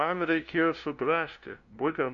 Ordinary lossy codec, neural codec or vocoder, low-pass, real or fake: Opus, 64 kbps; codec, 16 kHz, 0.5 kbps, FunCodec, trained on LibriTTS, 25 frames a second; 7.2 kHz; fake